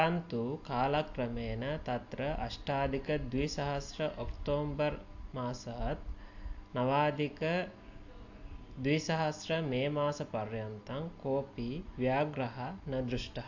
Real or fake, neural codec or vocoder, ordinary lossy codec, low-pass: real; none; AAC, 48 kbps; 7.2 kHz